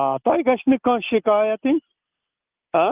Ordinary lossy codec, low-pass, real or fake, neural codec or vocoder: Opus, 24 kbps; 3.6 kHz; real; none